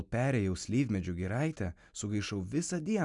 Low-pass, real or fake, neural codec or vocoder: 10.8 kHz; real; none